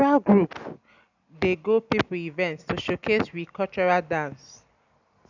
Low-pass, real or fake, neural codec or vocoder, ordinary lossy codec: 7.2 kHz; real; none; none